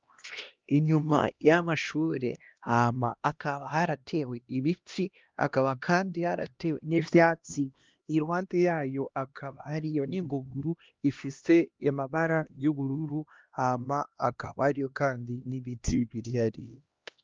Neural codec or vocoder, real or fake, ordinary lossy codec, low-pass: codec, 16 kHz, 1 kbps, X-Codec, HuBERT features, trained on LibriSpeech; fake; Opus, 32 kbps; 7.2 kHz